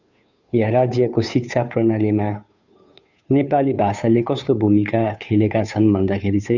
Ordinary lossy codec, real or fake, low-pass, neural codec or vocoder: none; fake; 7.2 kHz; codec, 16 kHz, 2 kbps, FunCodec, trained on Chinese and English, 25 frames a second